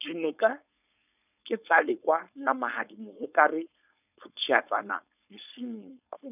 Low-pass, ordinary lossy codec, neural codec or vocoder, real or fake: 3.6 kHz; none; codec, 16 kHz, 4.8 kbps, FACodec; fake